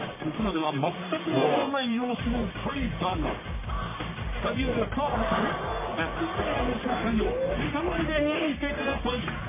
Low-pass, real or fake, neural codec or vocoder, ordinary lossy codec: 3.6 kHz; fake; codec, 44.1 kHz, 1.7 kbps, Pupu-Codec; MP3, 16 kbps